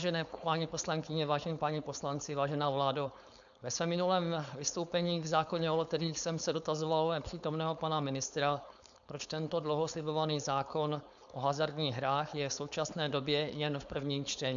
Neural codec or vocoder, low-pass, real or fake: codec, 16 kHz, 4.8 kbps, FACodec; 7.2 kHz; fake